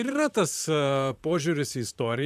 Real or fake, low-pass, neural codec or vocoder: fake; 14.4 kHz; vocoder, 44.1 kHz, 128 mel bands, Pupu-Vocoder